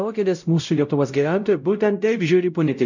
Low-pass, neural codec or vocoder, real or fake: 7.2 kHz; codec, 16 kHz, 0.5 kbps, X-Codec, WavLM features, trained on Multilingual LibriSpeech; fake